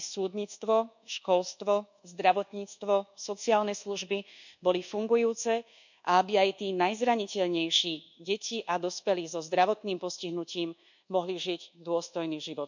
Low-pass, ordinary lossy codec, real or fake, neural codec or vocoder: 7.2 kHz; none; fake; codec, 24 kHz, 1.2 kbps, DualCodec